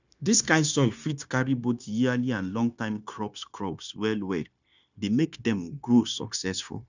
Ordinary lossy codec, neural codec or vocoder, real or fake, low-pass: none; codec, 16 kHz, 0.9 kbps, LongCat-Audio-Codec; fake; 7.2 kHz